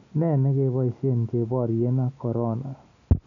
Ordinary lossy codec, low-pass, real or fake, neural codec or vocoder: none; 7.2 kHz; real; none